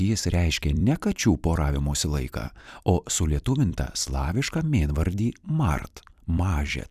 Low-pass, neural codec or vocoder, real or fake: 14.4 kHz; vocoder, 44.1 kHz, 128 mel bands every 256 samples, BigVGAN v2; fake